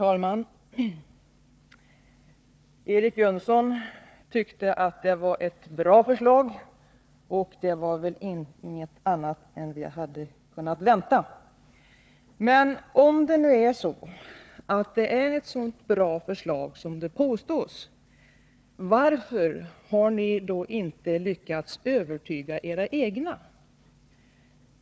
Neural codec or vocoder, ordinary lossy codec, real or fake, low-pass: codec, 16 kHz, 4 kbps, FunCodec, trained on Chinese and English, 50 frames a second; none; fake; none